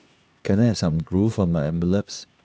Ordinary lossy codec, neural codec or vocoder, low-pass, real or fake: none; codec, 16 kHz, 2 kbps, X-Codec, HuBERT features, trained on LibriSpeech; none; fake